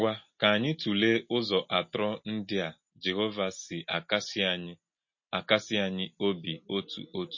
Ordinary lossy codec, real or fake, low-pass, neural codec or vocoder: MP3, 32 kbps; real; 7.2 kHz; none